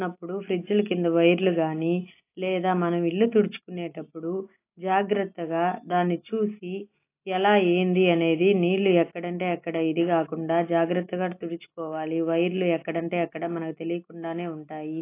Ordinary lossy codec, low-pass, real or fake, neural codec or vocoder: AAC, 24 kbps; 3.6 kHz; real; none